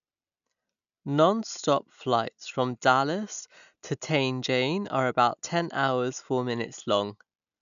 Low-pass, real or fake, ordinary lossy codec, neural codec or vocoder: 7.2 kHz; real; none; none